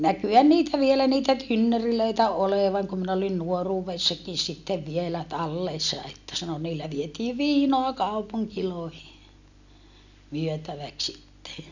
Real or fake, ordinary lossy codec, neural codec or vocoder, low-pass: real; none; none; 7.2 kHz